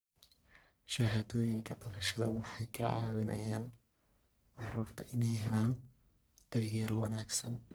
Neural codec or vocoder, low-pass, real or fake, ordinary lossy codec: codec, 44.1 kHz, 1.7 kbps, Pupu-Codec; none; fake; none